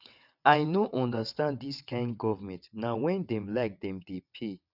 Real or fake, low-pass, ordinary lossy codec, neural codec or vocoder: fake; 5.4 kHz; none; vocoder, 22.05 kHz, 80 mel bands, WaveNeXt